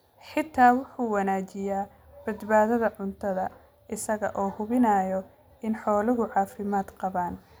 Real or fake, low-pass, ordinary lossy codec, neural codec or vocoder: real; none; none; none